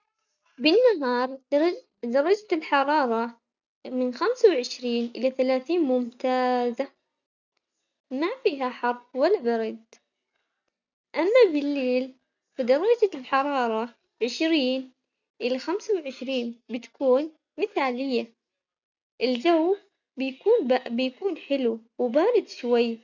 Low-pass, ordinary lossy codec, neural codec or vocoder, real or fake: 7.2 kHz; none; none; real